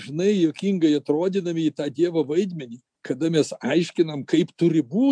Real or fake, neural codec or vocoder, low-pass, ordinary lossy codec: real; none; 9.9 kHz; MP3, 96 kbps